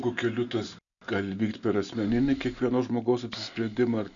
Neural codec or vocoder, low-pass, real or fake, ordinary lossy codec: none; 7.2 kHz; real; MP3, 96 kbps